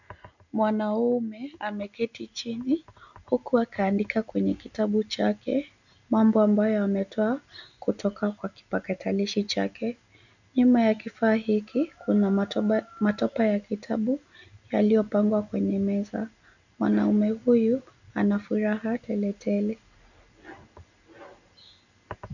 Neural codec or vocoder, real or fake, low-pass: none; real; 7.2 kHz